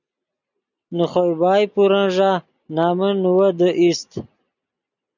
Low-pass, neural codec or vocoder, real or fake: 7.2 kHz; none; real